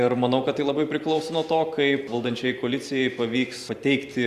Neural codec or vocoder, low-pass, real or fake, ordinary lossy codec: vocoder, 44.1 kHz, 128 mel bands every 512 samples, BigVGAN v2; 14.4 kHz; fake; Opus, 64 kbps